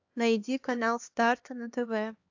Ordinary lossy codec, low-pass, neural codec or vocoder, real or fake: AAC, 48 kbps; 7.2 kHz; codec, 16 kHz, 2 kbps, X-Codec, HuBERT features, trained on LibriSpeech; fake